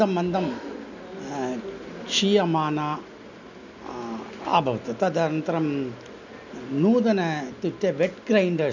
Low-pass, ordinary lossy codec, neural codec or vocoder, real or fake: 7.2 kHz; none; none; real